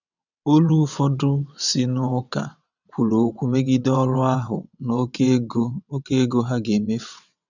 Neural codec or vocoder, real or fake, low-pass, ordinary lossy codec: vocoder, 22.05 kHz, 80 mel bands, WaveNeXt; fake; 7.2 kHz; none